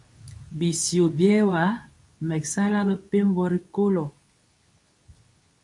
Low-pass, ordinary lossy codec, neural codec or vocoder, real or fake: 10.8 kHz; MP3, 96 kbps; codec, 24 kHz, 0.9 kbps, WavTokenizer, medium speech release version 2; fake